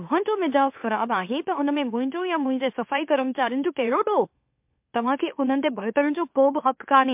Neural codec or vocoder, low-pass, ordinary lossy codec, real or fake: autoencoder, 44.1 kHz, a latent of 192 numbers a frame, MeloTTS; 3.6 kHz; MP3, 32 kbps; fake